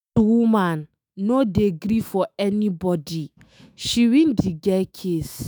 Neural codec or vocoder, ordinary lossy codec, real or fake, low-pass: autoencoder, 48 kHz, 128 numbers a frame, DAC-VAE, trained on Japanese speech; none; fake; none